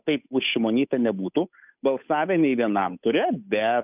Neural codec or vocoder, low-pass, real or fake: codec, 16 kHz, 8 kbps, FunCodec, trained on Chinese and English, 25 frames a second; 3.6 kHz; fake